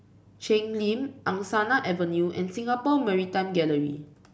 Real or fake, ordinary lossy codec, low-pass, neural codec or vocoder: real; none; none; none